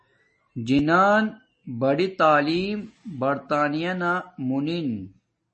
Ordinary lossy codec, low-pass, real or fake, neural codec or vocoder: MP3, 32 kbps; 10.8 kHz; real; none